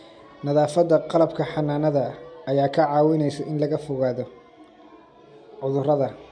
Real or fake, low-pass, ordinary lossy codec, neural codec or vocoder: real; 9.9 kHz; MP3, 64 kbps; none